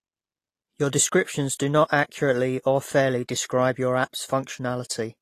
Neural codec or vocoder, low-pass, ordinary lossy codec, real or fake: none; 14.4 kHz; AAC, 48 kbps; real